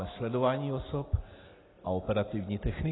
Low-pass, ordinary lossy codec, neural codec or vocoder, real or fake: 7.2 kHz; AAC, 16 kbps; none; real